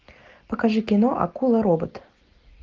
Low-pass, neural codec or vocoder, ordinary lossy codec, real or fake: 7.2 kHz; none; Opus, 32 kbps; real